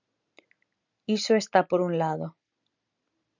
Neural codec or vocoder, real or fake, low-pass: none; real; 7.2 kHz